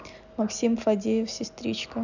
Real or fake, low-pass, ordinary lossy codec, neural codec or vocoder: real; 7.2 kHz; none; none